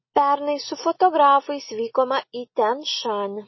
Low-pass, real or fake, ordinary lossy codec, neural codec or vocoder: 7.2 kHz; real; MP3, 24 kbps; none